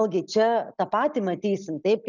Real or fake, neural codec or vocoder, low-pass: real; none; 7.2 kHz